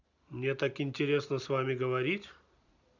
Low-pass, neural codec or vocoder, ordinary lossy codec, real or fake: 7.2 kHz; none; none; real